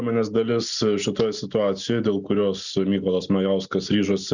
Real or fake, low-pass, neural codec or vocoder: real; 7.2 kHz; none